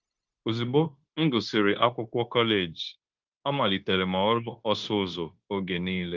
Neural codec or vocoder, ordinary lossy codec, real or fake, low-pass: codec, 16 kHz, 0.9 kbps, LongCat-Audio-Codec; Opus, 32 kbps; fake; 7.2 kHz